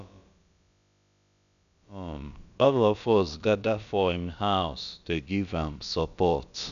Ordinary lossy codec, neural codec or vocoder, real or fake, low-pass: none; codec, 16 kHz, about 1 kbps, DyCAST, with the encoder's durations; fake; 7.2 kHz